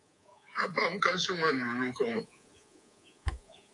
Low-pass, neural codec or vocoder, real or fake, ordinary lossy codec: 10.8 kHz; codec, 24 kHz, 3.1 kbps, DualCodec; fake; AAC, 48 kbps